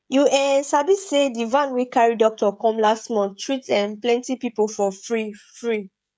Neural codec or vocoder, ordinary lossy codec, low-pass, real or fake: codec, 16 kHz, 16 kbps, FreqCodec, smaller model; none; none; fake